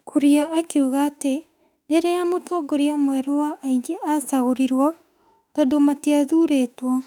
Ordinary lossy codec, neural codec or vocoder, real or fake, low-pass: none; autoencoder, 48 kHz, 32 numbers a frame, DAC-VAE, trained on Japanese speech; fake; 19.8 kHz